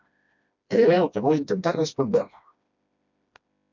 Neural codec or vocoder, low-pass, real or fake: codec, 16 kHz, 1 kbps, FreqCodec, smaller model; 7.2 kHz; fake